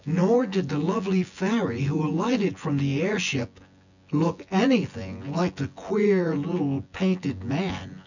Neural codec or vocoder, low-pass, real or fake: vocoder, 24 kHz, 100 mel bands, Vocos; 7.2 kHz; fake